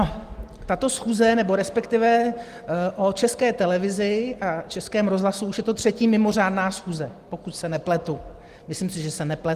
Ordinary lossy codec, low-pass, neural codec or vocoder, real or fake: Opus, 24 kbps; 14.4 kHz; none; real